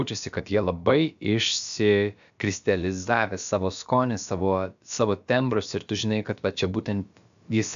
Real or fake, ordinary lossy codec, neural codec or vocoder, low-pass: fake; AAC, 96 kbps; codec, 16 kHz, about 1 kbps, DyCAST, with the encoder's durations; 7.2 kHz